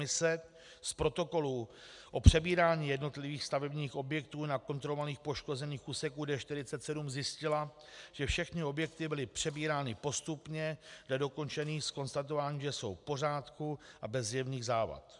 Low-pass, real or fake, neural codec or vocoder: 10.8 kHz; real; none